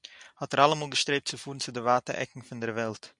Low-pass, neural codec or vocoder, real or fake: 10.8 kHz; none; real